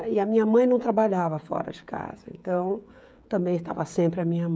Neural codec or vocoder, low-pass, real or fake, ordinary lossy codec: codec, 16 kHz, 16 kbps, FreqCodec, smaller model; none; fake; none